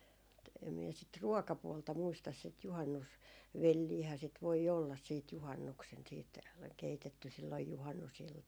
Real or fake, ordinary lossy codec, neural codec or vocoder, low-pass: real; none; none; none